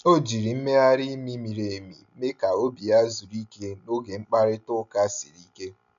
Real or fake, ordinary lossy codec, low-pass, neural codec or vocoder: real; MP3, 96 kbps; 7.2 kHz; none